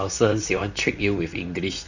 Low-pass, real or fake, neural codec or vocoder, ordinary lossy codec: 7.2 kHz; fake; vocoder, 44.1 kHz, 128 mel bands, Pupu-Vocoder; none